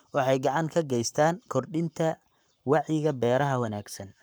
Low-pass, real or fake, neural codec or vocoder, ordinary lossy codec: none; fake; codec, 44.1 kHz, 7.8 kbps, Pupu-Codec; none